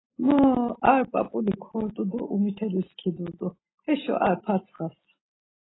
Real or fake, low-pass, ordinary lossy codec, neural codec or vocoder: real; 7.2 kHz; AAC, 16 kbps; none